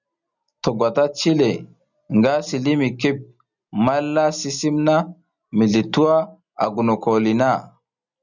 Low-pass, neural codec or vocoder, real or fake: 7.2 kHz; none; real